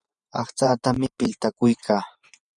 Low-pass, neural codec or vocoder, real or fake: 10.8 kHz; vocoder, 24 kHz, 100 mel bands, Vocos; fake